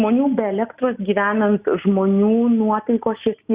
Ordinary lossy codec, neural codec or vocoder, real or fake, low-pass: Opus, 64 kbps; none; real; 3.6 kHz